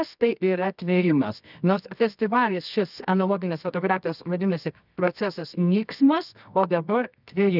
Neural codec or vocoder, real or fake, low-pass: codec, 24 kHz, 0.9 kbps, WavTokenizer, medium music audio release; fake; 5.4 kHz